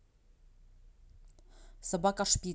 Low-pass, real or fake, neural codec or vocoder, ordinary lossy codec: none; real; none; none